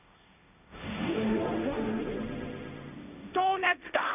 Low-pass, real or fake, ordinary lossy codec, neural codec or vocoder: 3.6 kHz; fake; none; codec, 16 kHz, 1.1 kbps, Voila-Tokenizer